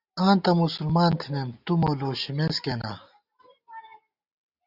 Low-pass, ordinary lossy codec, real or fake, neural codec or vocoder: 5.4 kHz; Opus, 64 kbps; real; none